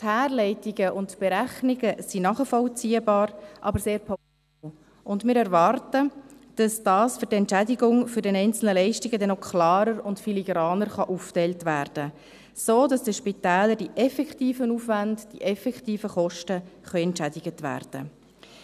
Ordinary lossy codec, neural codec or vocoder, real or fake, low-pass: none; none; real; 14.4 kHz